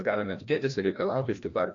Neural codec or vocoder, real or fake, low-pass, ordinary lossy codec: codec, 16 kHz, 1 kbps, FreqCodec, larger model; fake; 7.2 kHz; AAC, 48 kbps